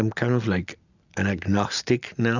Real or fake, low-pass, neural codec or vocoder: real; 7.2 kHz; none